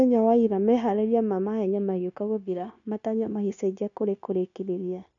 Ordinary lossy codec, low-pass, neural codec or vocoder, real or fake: none; 7.2 kHz; codec, 16 kHz, 0.9 kbps, LongCat-Audio-Codec; fake